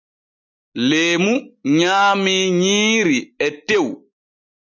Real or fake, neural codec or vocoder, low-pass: real; none; 7.2 kHz